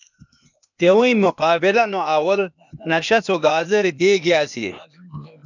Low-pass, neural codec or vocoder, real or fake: 7.2 kHz; codec, 16 kHz, 0.8 kbps, ZipCodec; fake